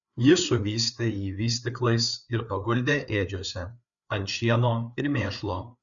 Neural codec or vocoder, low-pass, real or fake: codec, 16 kHz, 4 kbps, FreqCodec, larger model; 7.2 kHz; fake